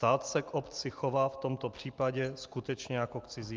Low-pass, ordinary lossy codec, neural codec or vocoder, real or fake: 7.2 kHz; Opus, 32 kbps; none; real